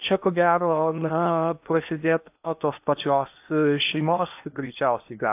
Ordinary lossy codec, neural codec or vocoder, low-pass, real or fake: AAC, 32 kbps; codec, 16 kHz in and 24 kHz out, 0.8 kbps, FocalCodec, streaming, 65536 codes; 3.6 kHz; fake